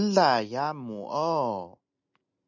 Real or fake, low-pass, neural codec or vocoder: real; 7.2 kHz; none